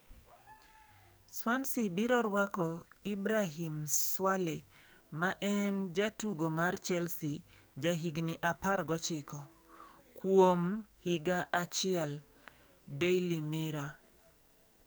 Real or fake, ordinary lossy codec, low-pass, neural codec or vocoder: fake; none; none; codec, 44.1 kHz, 2.6 kbps, SNAC